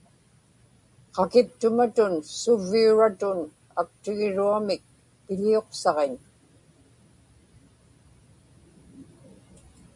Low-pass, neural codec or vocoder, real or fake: 10.8 kHz; none; real